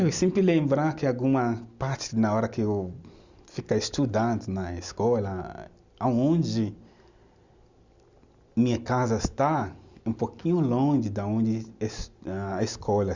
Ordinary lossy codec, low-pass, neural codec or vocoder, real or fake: Opus, 64 kbps; 7.2 kHz; none; real